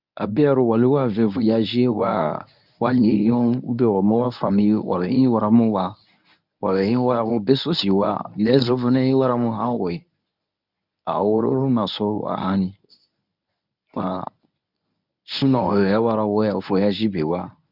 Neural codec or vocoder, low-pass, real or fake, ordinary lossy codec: codec, 24 kHz, 0.9 kbps, WavTokenizer, medium speech release version 1; 5.4 kHz; fake; none